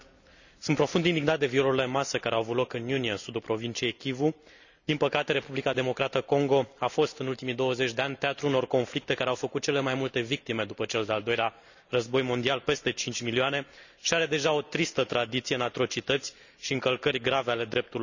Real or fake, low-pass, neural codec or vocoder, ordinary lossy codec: real; 7.2 kHz; none; none